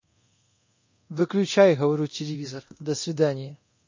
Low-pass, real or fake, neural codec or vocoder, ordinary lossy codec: 7.2 kHz; fake; codec, 24 kHz, 0.9 kbps, DualCodec; MP3, 32 kbps